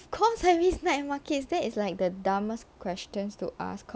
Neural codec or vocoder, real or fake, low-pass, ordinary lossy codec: none; real; none; none